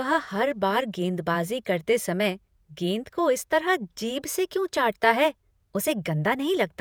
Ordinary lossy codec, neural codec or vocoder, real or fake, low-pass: none; vocoder, 48 kHz, 128 mel bands, Vocos; fake; none